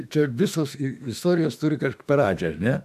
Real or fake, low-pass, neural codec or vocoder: fake; 14.4 kHz; autoencoder, 48 kHz, 32 numbers a frame, DAC-VAE, trained on Japanese speech